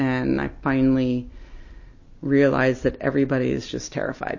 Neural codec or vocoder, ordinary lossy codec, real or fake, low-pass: none; MP3, 32 kbps; real; 7.2 kHz